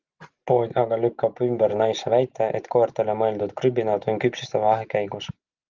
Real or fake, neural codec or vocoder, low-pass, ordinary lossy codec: real; none; 7.2 kHz; Opus, 24 kbps